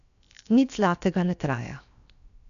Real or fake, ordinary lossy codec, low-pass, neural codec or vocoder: fake; none; 7.2 kHz; codec, 16 kHz, 0.7 kbps, FocalCodec